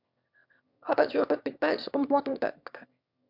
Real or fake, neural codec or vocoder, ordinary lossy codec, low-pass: fake; autoencoder, 22.05 kHz, a latent of 192 numbers a frame, VITS, trained on one speaker; MP3, 48 kbps; 5.4 kHz